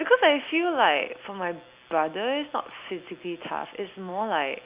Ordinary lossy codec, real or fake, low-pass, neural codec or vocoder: Opus, 64 kbps; real; 3.6 kHz; none